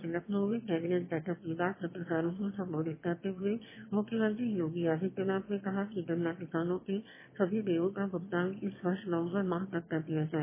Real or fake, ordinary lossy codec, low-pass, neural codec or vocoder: fake; MP3, 16 kbps; 3.6 kHz; autoencoder, 22.05 kHz, a latent of 192 numbers a frame, VITS, trained on one speaker